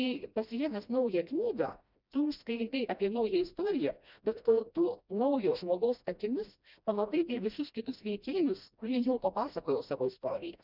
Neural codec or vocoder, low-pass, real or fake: codec, 16 kHz, 1 kbps, FreqCodec, smaller model; 5.4 kHz; fake